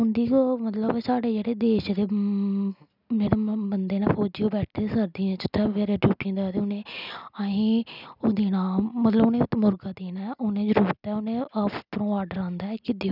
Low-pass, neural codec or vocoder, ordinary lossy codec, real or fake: 5.4 kHz; none; none; real